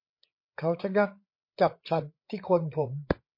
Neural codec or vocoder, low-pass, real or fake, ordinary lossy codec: codec, 16 kHz, 8 kbps, FreqCodec, larger model; 5.4 kHz; fake; MP3, 32 kbps